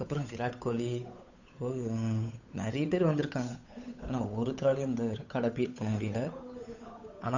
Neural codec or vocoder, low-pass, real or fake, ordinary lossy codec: codec, 16 kHz, 8 kbps, FunCodec, trained on Chinese and English, 25 frames a second; 7.2 kHz; fake; none